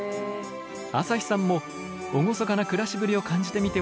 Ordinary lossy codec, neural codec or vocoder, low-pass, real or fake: none; none; none; real